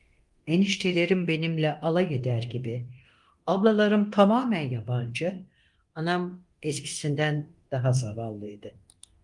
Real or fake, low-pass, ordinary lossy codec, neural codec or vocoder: fake; 10.8 kHz; Opus, 24 kbps; codec, 24 kHz, 0.9 kbps, DualCodec